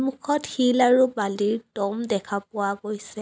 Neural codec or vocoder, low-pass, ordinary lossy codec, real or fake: none; none; none; real